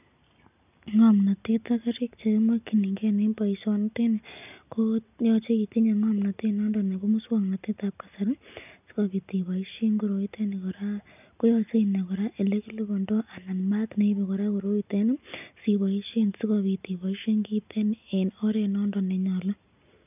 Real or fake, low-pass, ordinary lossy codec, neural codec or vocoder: real; 3.6 kHz; none; none